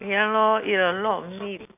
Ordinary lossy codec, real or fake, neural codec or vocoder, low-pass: none; real; none; 3.6 kHz